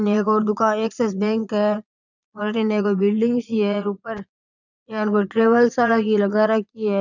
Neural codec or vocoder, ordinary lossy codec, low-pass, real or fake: vocoder, 22.05 kHz, 80 mel bands, Vocos; none; 7.2 kHz; fake